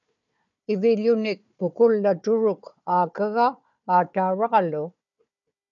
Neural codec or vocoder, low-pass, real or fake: codec, 16 kHz, 4 kbps, FunCodec, trained on Chinese and English, 50 frames a second; 7.2 kHz; fake